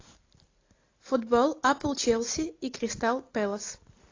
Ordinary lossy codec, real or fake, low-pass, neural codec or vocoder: AAC, 32 kbps; real; 7.2 kHz; none